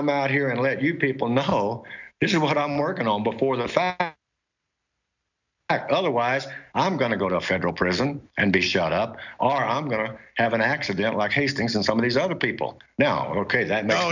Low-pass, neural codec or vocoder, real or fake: 7.2 kHz; none; real